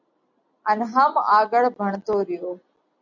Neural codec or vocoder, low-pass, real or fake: none; 7.2 kHz; real